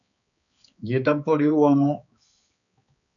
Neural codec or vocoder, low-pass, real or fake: codec, 16 kHz, 4 kbps, X-Codec, HuBERT features, trained on balanced general audio; 7.2 kHz; fake